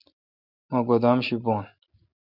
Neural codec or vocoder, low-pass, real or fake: none; 5.4 kHz; real